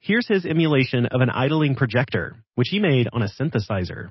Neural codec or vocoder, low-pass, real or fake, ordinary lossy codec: none; 7.2 kHz; real; MP3, 24 kbps